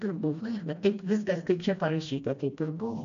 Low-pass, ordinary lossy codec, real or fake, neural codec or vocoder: 7.2 kHz; MP3, 64 kbps; fake; codec, 16 kHz, 1 kbps, FreqCodec, smaller model